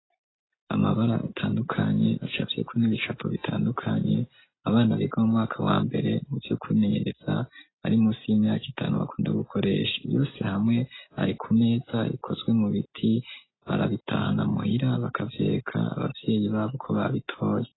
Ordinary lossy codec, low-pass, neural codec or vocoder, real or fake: AAC, 16 kbps; 7.2 kHz; none; real